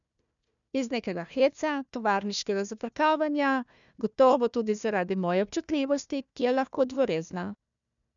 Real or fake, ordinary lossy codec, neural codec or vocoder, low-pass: fake; MP3, 96 kbps; codec, 16 kHz, 1 kbps, FunCodec, trained on Chinese and English, 50 frames a second; 7.2 kHz